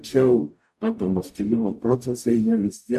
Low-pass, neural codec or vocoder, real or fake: 14.4 kHz; codec, 44.1 kHz, 0.9 kbps, DAC; fake